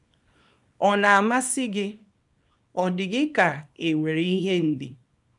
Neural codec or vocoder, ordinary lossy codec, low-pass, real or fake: codec, 24 kHz, 0.9 kbps, WavTokenizer, small release; none; 10.8 kHz; fake